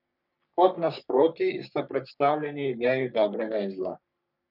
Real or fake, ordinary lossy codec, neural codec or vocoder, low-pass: fake; none; codec, 44.1 kHz, 3.4 kbps, Pupu-Codec; 5.4 kHz